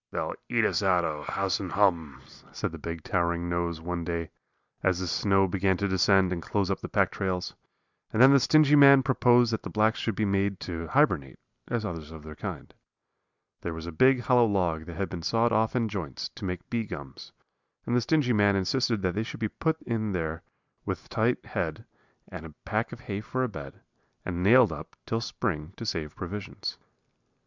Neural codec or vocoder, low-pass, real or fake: none; 7.2 kHz; real